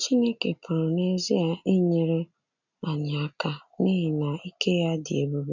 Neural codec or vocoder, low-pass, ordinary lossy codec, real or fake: none; 7.2 kHz; none; real